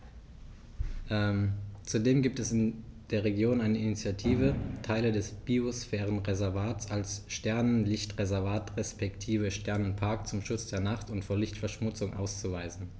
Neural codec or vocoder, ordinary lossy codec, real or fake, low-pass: none; none; real; none